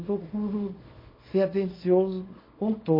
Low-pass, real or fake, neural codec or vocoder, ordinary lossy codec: 5.4 kHz; fake; codec, 24 kHz, 0.9 kbps, WavTokenizer, small release; MP3, 24 kbps